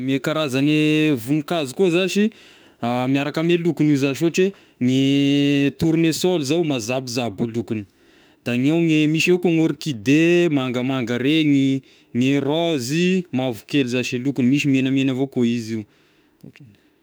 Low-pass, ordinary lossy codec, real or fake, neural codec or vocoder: none; none; fake; autoencoder, 48 kHz, 32 numbers a frame, DAC-VAE, trained on Japanese speech